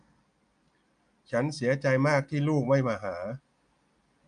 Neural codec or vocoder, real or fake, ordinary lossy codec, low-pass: none; real; none; 9.9 kHz